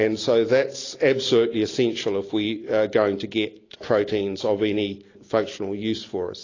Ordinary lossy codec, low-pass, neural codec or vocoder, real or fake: AAC, 32 kbps; 7.2 kHz; none; real